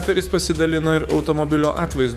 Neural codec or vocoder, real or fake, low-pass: codec, 44.1 kHz, 7.8 kbps, DAC; fake; 14.4 kHz